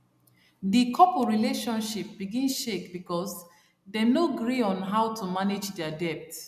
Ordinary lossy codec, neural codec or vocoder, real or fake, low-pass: none; none; real; 14.4 kHz